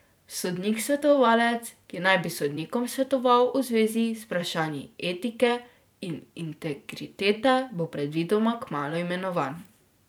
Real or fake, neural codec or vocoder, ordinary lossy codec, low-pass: fake; vocoder, 44.1 kHz, 128 mel bands every 512 samples, BigVGAN v2; none; none